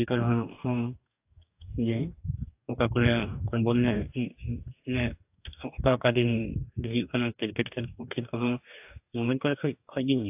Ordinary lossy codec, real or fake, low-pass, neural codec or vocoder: AAC, 32 kbps; fake; 3.6 kHz; codec, 44.1 kHz, 2.6 kbps, DAC